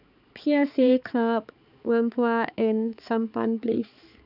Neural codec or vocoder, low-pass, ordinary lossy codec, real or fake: codec, 16 kHz, 4 kbps, X-Codec, HuBERT features, trained on balanced general audio; 5.4 kHz; none; fake